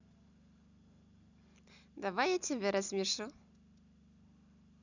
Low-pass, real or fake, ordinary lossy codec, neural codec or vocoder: 7.2 kHz; real; none; none